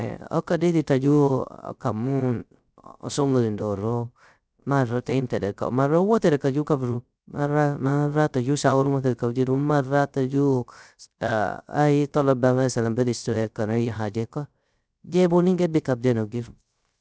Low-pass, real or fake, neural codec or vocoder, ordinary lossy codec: none; fake; codec, 16 kHz, 0.3 kbps, FocalCodec; none